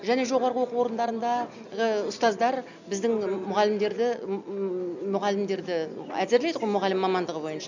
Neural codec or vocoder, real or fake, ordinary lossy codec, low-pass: none; real; none; 7.2 kHz